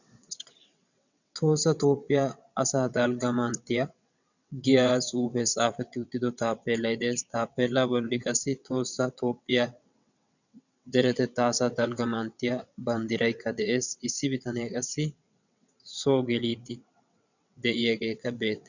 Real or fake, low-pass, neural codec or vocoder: fake; 7.2 kHz; vocoder, 22.05 kHz, 80 mel bands, WaveNeXt